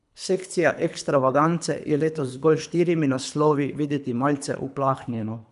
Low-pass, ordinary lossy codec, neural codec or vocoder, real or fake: 10.8 kHz; none; codec, 24 kHz, 3 kbps, HILCodec; fake